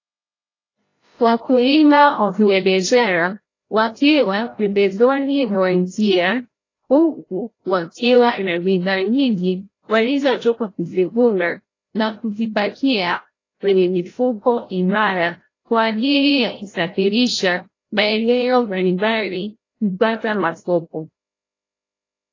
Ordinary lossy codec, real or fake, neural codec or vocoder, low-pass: AAC, 32 kbps; fake; codec, 16 kHz, 0.5 kbps, FreqCodec, larger model; 7.2 kHz